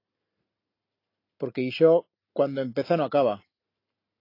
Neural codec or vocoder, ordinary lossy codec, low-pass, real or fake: none; AAC, 32 kbps; 5.4 kHz; real